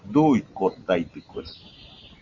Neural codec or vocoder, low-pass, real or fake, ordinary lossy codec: none; 7.2 kHz; real; Opus, 64 kbps